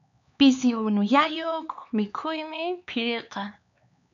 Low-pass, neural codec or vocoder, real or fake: 7.2 kHz; codec, 16 kHz, 4 kbps, X-Codec, HuBERT features, trained on LibriSpeech; fake